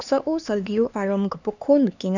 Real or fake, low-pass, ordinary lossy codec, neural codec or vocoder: fake; 7.2 kHz; none; codec, 16 kHz, 4 kbps, X-Codec, HuBERT features, trained on LibriSpeech